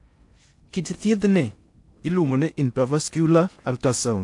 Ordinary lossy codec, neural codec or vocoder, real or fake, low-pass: AAC, 64 kbps; codec, 16 kHz in and 24 kHz out, 0.6 kbps, FocalCodec, streaming, 4096 codes; fake; 10.8 kHz